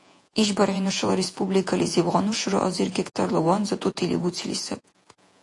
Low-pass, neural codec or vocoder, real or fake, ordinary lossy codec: 10.8 kHz; vocoder, 48 kHz, 128 mel bands, Vocos; fake; AAC, 48 kbps